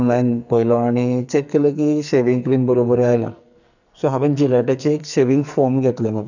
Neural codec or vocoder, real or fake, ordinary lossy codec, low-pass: codec, 44.1 kHz, 2.6 kbps, SNAC; fake; none; 7.2 kHz